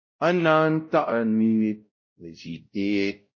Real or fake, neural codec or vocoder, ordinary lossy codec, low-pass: fake; codec, 16 kHz, 0.5 kbps, X-Codec, WavLM features, trained on Multilingual LibriSpeech; MP3, 32 kbps; 7.2 kHz